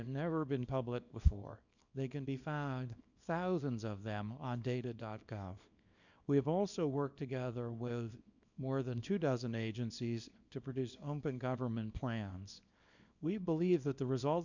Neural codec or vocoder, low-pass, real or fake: codec, 24 kHz, 0.9 kbps, WavTokenizer, small release; 7.2 kHz; fake